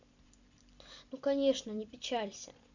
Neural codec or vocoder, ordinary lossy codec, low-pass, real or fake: vocoder, 44.1 kHz, 128 mel bands every 256 samples, BigVGAN v2; none; 7.2 kHz; fake